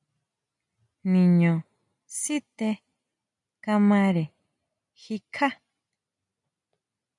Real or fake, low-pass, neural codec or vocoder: real; 10.8 kHz; none